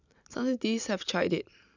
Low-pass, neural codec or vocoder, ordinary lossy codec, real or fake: 7.2 kHz; none; none; real